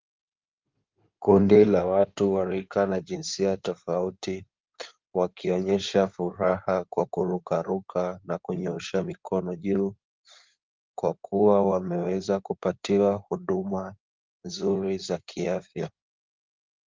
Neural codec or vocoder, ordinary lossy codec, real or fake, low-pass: codec, 16 kHz in and 24 kHz out, 2.2 kbps, FireRedTTS-2 codec; Opus, 24 kbps; fake; 7.2 kHz